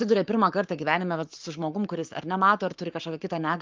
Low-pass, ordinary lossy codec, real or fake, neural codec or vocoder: 7.2 kHz; Opus, 24 kbps; fake; codec, 44.1 kHz, 7.8 kbps, Pupu-Codec